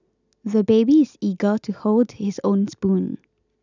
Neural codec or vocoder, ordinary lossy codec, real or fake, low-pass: none; none; real; 7.2 kHz